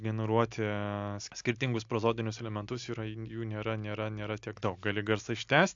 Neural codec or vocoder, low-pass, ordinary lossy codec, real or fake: none; 7.2 kHz; AAC, 64 kbps; real